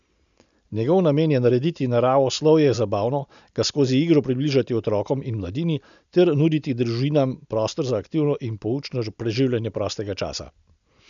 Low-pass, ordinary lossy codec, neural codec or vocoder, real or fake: 7.2 kHz; none; none; real